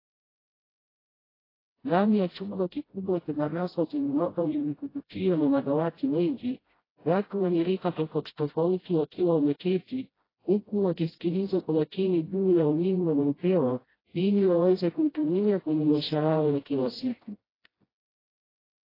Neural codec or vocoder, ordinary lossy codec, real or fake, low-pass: codec, 16 kHz, 0.5 kbps, FreqCodec, smaller model; AAC, 24 kbps; fake; 5.4 kHz